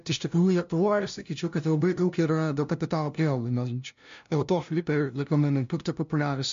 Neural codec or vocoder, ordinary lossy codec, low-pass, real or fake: codec, 16 kHz, 0.5 kbps, FunCodec, trained on LibriTTS, 25 frames a second; MP3, 48 kbps; 7.2 kHz; fake